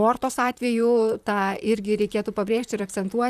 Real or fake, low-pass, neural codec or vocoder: fake; 14.4 kHz; vocoder, 44.1 kHz, 128 mel bands, Pupu-Vocoder